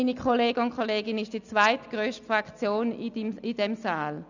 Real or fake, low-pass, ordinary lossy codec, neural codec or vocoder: real; 7.2 kHz; none; none